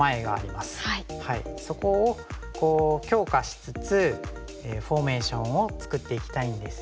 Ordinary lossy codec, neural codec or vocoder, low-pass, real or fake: none; none; none; real